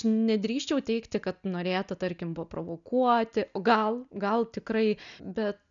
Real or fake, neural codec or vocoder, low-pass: real; none; 7.2 kHz